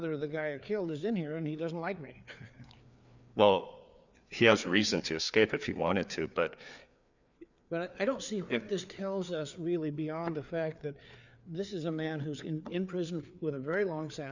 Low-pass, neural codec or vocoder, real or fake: 7.2 kHz; codec, 16 kHz, 4 kbps, FreqCodec, larger model; fake